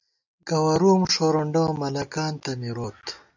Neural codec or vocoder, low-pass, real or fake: none; 7.2 kHz; real